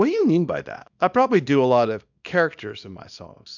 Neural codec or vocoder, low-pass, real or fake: codec, 24 kHz, 0.9 kbps, WavTokenizer, small release; 7.2 kHz; fake